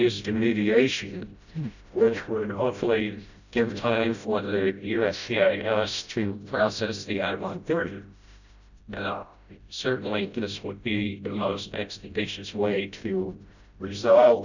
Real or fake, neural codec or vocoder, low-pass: fake; codec, 16 kHz, 0.5 kbps, FreqCodec, smaller model; 7.2 kHz